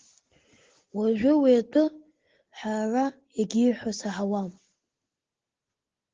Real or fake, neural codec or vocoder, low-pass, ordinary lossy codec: real; none; 7.2 kHz; Opus, 16 kbps